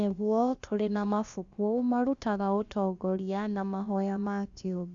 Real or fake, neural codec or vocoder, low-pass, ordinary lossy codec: fake; codec, 16 kHz, about 1 kbps, DyCAST, with the encoder's durations; 7.2 kHz; Opus, 64 kbps